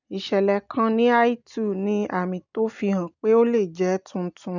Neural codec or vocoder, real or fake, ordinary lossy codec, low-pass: none; real; none; 7.2 kHz